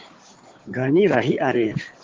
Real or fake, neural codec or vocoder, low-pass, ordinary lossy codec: fake; codec, 16 kHz in and 24 kHz out, 2.2 kbps, FireRedTTS-2 codec; 7.2 kHz; Opus, 16 kbps